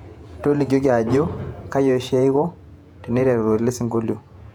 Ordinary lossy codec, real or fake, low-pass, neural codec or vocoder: none; fake; 19.8 kHz; vocoder, 48 kHz, 128 mel bands, Vocos